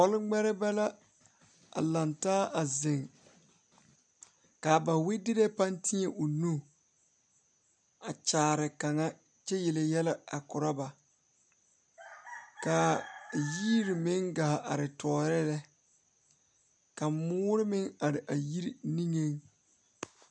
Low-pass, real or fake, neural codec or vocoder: 9.9 kHz; real; none